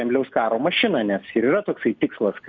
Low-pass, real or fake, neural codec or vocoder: 7.2 kHz; real; none